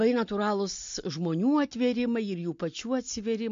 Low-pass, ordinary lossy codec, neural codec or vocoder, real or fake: 7.2 kHz; MP3, 48 kbps; none; real